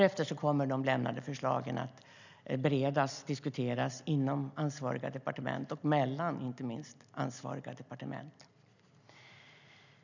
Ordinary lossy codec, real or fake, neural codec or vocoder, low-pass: none; real; none; 7.2 kHz